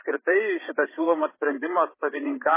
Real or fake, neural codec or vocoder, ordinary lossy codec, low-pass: fake; codec, 16 kHz, 8 kbps, FreqCodec, larger model; MP3, 16 kbps; 3.6 kHz